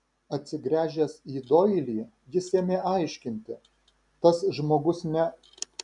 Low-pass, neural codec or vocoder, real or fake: 10.8 kHz; none; real